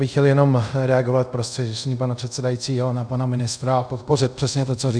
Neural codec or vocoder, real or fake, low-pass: codec, 24 kHz, 0.5 kbps, DualCodec; fake; 9.9 kHz